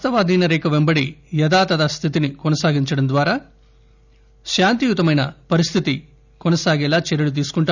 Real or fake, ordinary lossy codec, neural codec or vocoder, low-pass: real; none; none; 7.2 kHz